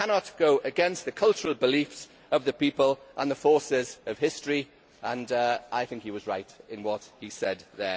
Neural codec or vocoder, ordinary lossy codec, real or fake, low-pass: none; none; real; none